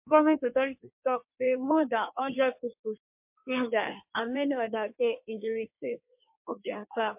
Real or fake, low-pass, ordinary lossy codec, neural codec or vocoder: fake; 3.6 kHz; MP3, 32 kbps; codec, 16 kHz in and 24 kHz out, 1.1 kbps, FireRedTTS-2 codec